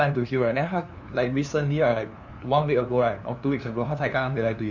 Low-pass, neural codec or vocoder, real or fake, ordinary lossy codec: 7.2 kHz; codec, 16 kHz, 2 kbps, FunCodec, trained on LibriTTS, 25 frames a second; fake; none